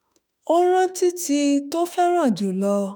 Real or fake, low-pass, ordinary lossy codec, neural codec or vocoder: fake; none; none; autoencoder, 48 kHz, 32 numbers a frame, DAC-VAE, trained on Japanese speech